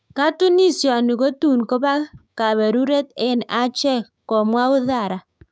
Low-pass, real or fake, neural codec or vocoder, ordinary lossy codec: none; fake; codec, 16 kHz, 6 kbps, DAC; none